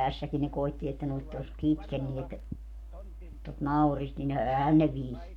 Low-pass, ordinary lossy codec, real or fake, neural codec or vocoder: 19.8 kHz; none; fake; codec, 44.1 kHz, 7.8 kbps, Pupu-Codec